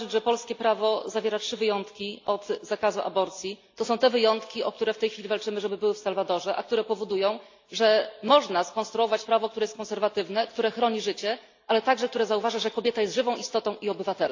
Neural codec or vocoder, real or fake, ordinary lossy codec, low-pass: none; real; AAC, 48 kbps; 7.2 kHz